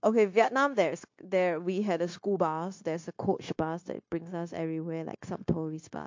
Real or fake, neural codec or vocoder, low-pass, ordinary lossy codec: fake; codec, 16 kHz, 0.9 kbps, LongCat-Audio-Codec; 7.2 kHz; MP3, 48 kbps